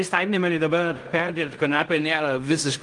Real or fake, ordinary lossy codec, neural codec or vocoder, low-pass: fake; Opus, 32 kbps; codec, 16 kHz in and 24 kHz out, 0.4 kbps, LongCat-Audio-Codec, fine tuned four codebook decoder; 10.8 kHz